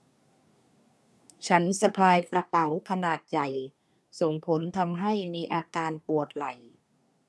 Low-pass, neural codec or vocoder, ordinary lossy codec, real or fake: none; codec, 24 kHz, 1 kbps, SNAC; none; fake